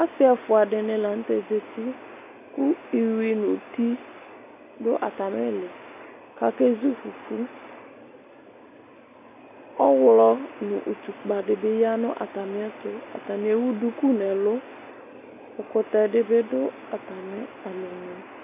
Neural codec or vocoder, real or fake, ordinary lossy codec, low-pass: none; real; AAC, 32 kbps; 3.6 kHz